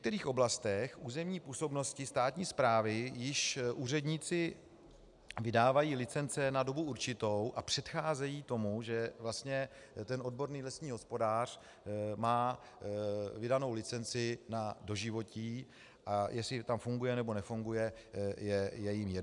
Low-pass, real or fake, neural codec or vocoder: 10.8 kHz; real; none